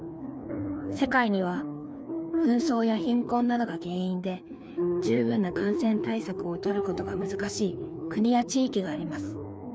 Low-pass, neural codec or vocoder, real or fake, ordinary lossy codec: none; codec, 16 kHz, 2 kbps, FreqCodec, larger model; fake; none